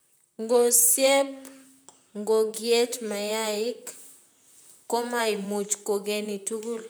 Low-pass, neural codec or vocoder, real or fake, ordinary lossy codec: none; vocoder, 44.1 kHz, 128 mel bands, Pupu-Vocoder; fake; none